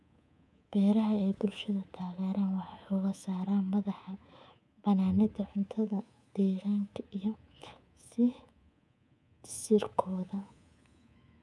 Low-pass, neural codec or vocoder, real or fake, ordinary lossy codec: none; codec, 24 kHz, 3.1 kbps, DualCodec; fake; none